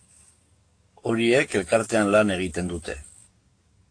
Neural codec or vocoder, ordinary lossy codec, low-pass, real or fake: codec, 44.1 kHz, 7.8 kbps, DAC; AAC, 48 kbps; 9.9 kHz; fake